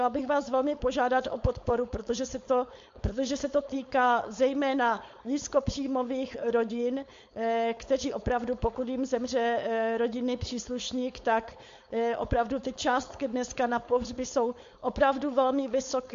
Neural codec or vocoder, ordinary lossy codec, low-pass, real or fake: codec, 16 kHz, 4.8 kbps, FACodec; MP3, 48 kbps; 7.2 kHz; fake